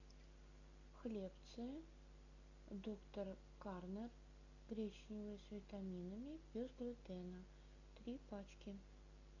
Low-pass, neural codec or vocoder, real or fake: 7.2 kHz; none; real